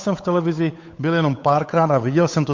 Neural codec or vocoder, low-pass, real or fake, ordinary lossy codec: codec, 16 kHz, 8 kbps, FunCodec, trained on Chinese and English, 25 frames a second; 7.2 kHz; fake; MP3, 48 kbps